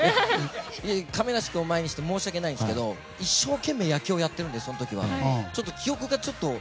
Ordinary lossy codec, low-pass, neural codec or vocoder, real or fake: none; none; none; real